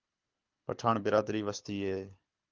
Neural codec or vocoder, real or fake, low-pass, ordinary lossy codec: codec, 24 kHz, 6 kbps, HILCodec; fake; 7.2 kHz; Opus, 32 kbps